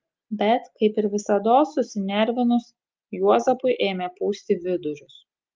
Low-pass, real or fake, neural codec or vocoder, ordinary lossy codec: 7.2 kHz; real; none; Opus, 24 kbps